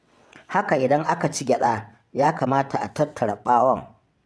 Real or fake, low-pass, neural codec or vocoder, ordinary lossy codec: fake; none; vocoder, 22.05 kHz, 80 mel bands, WaveNeXt; none